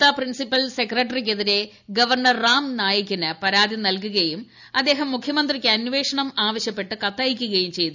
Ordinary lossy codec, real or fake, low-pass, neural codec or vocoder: none; real; 7.2 kHz; none